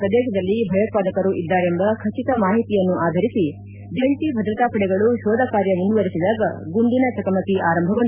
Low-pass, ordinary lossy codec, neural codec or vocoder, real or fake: 3.6 kHz; none; none; real